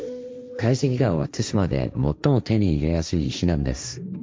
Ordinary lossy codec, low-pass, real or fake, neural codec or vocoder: none; none; fake; codec, 16 kHz, 1.1 kbps, Voila-Tokenizer